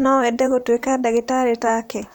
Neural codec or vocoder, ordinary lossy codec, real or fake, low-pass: vocoder, 44.1 kHz, 128 mel bands, Pupu-Vocoder; none; fake; 19.8 kHz